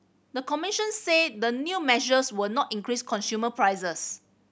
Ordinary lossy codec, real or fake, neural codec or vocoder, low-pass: none; real; none; none